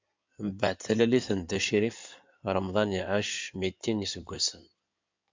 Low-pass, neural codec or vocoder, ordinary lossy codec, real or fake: 7.2 kHz; codec, 16 kHz in and 24 kHz out, 2.2 kbps, FireRedTTS-2 codec; MP3, 64 kbps; fake